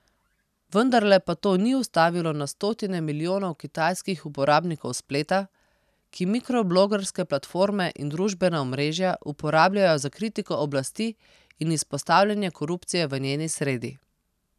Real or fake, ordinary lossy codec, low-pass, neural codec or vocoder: real; none; 14.4 kHz; none